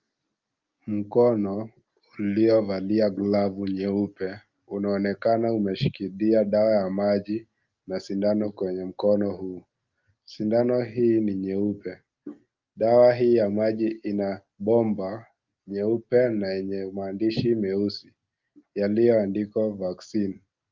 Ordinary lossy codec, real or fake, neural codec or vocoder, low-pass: Opus, 24 kbps; real; none; 7.2 kHz